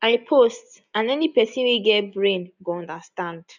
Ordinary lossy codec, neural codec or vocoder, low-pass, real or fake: none; none; 7.2 kHz; real